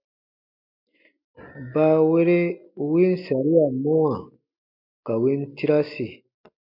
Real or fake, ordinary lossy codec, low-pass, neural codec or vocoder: real; MP3, 48 kbps; 5.4 kHz; none